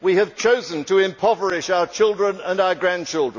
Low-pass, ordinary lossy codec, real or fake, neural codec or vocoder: 7.2 kHz; none; real; none